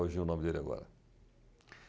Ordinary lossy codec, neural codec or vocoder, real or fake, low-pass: none; none; real; none